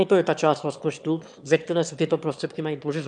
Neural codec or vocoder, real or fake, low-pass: autoencoder, 22.05 kHz, a latent of 192 numbers a frame, VITS, trained on one speaker; fake; 9.9 kHz